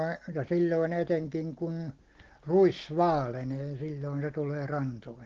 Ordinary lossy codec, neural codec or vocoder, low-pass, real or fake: Opus, 16 kbps; none; 7.2 kHz; real